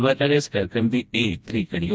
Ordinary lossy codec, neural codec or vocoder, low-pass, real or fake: none; codec, 16 kHz, 1 kbps, FreqCodec, smaller model; none; fake